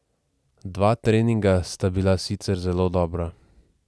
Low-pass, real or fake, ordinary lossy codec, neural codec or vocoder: none; real; none; none